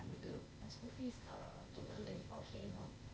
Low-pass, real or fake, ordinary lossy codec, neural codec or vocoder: none; fake; none; codec, 16 kHz, 0.8 kbps, ZipCodec